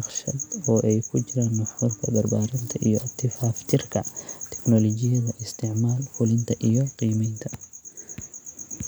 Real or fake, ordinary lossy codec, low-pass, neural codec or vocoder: real; none; none; none